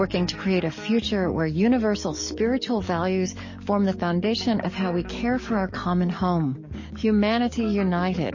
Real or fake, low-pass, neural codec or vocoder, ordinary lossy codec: fake; 7.2 kHz; codec, 44.1 kHz, 7.8 kbps, DAC; MP3, 32 kbps